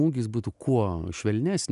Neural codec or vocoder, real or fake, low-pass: none; real; 10.8 kHz